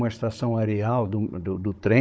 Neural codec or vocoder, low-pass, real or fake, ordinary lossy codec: codec, 16 kHz, 16 kbps, FunCodec, trained on Chinese and English, 50 frames a second; none; fake; none